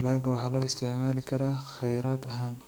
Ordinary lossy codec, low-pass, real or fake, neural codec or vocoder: none; none; fake; codec, 44.1 kHz, 2.6 kbps, SNAC